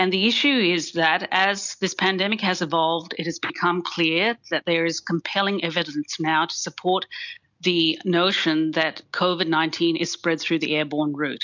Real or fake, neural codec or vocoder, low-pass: real; none; 7.2 kHz